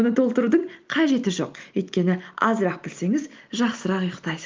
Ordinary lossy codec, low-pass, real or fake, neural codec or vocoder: Opus, 32 kbps; 7.2 kHz; real; none